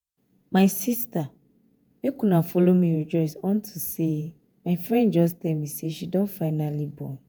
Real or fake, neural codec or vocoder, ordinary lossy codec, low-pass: fake; vocoder, 48 kHz, 128 mel bands, Vocos; none; none